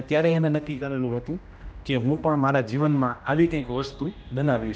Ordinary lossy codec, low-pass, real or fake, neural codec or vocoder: none; none; fake; codec, 16 kHz, 1 kbps, X-Codec, HuBERT features, trained on general audio